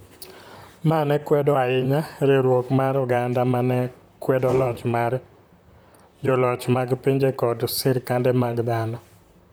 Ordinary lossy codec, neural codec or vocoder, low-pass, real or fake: none; vocoder, 44.1 kHz, 128 mel bands, Pupu-Vocoder; none; fake